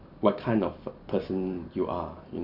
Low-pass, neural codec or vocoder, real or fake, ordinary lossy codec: 5.4 kHz; none; real; none